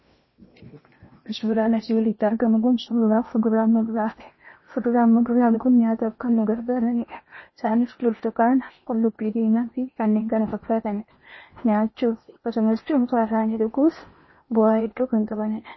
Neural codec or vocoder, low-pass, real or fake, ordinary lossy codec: codec, 16 kHz in and 24 kHz out, 0.8 kbps, FocalCodec, streaming, 65536 codes; 7.2 kHz; fake; MP3, 24 kbps